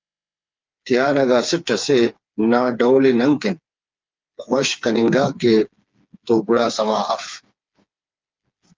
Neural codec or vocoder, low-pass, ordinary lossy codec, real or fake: codec, 16 kHz, 4 kbps, FreqCodec, smaller model; 7.2 kHz; Opus, 24 kbps; fake